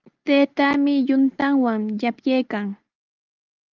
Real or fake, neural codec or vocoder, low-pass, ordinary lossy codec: real; none; 7.2 kHz; Opus, 32 kbps